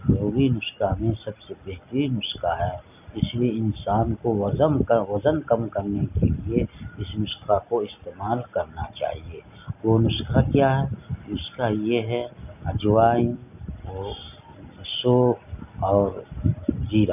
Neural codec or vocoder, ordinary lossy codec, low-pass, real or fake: none; none; 3.6 kHz; real